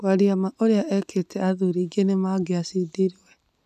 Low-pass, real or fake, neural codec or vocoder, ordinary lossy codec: 14.4 kHz; real; none; none